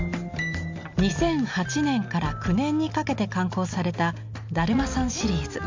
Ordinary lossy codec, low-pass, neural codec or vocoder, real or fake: MP3, 64 kbps; 7.2 kHz; none; real